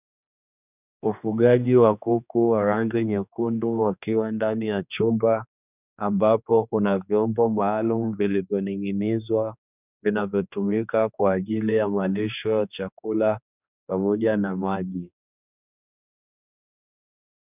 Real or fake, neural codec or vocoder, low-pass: fake; codec, 16 kHz, 2 kbps, X-Codec, HuBERT features, trained on general audio; 3.6 kHz